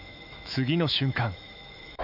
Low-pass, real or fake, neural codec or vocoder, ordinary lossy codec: 5.4 kHz; fake; autoencoder, 48 kHz, 128 numbers a frame, DAC-VAE, trained on Japanese speech; none